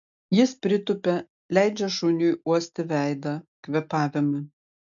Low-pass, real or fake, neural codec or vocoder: 7.2 kHz; real; none